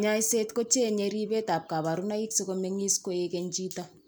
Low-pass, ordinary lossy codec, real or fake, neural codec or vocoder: none; none; real; none